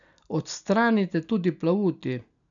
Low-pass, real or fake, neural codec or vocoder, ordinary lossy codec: 7.2 kHz; real; none; none